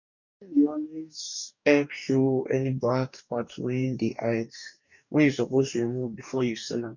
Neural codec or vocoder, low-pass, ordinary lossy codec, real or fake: codec, 44.1 kHz, 2.6 kbps, DAC; 7.2 kHz; none; fake